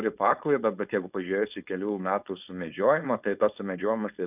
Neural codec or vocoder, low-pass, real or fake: codec, 16 kHz, 4.8 kbps, FACodec; 3.6 kHz; fake